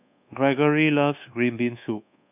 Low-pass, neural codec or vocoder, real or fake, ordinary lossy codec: 3.6 kHz; codec, 24 kHz, 1.2 kbps, DualCodec; fake; none